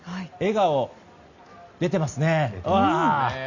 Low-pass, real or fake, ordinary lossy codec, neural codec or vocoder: 7.2 kHz; real; Opus, 64 kbps; none